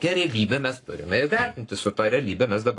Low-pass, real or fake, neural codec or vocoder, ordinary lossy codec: 10.8 kHz; fake; codec, 44.1 kHz, 7.8 kbps, Pupu-Codec; AAC, 48 kbps